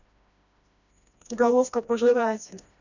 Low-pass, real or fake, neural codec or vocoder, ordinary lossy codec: 7.2 kHz; fake; codec, 16 kHz, 1 kbps, FreqCodec, smaller model; none